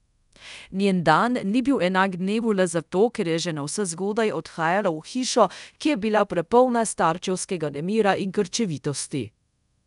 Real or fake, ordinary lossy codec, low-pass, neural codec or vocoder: fake; none; 10.8 kHz; codec, 24 kHz, 0.5 kbps, DualCodec